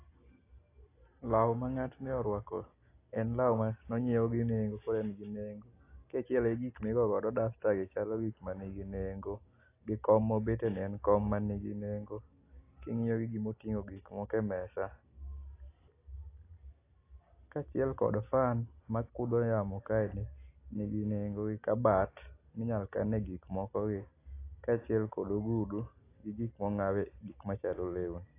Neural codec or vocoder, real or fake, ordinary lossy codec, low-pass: none; real; AAC, 24 kbps; 3.6 kHz